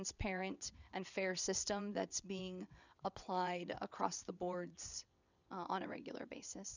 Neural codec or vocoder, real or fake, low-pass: vocoder, 22.05 kHz, 80 mel bands, WaveNeXt; fake; 7.2 kHz